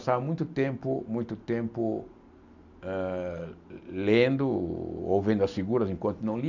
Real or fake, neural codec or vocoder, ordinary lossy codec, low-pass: real; none; none; 7.2 kHz